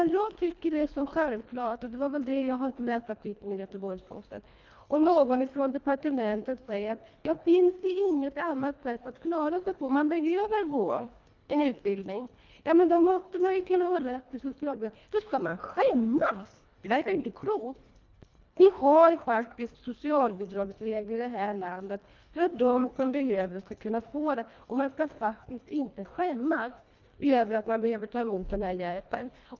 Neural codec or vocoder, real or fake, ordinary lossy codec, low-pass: codec, 24 kHz, 1.5 kbps, HILCodec; fake; Opus, 24 kbps; 7.2 kHz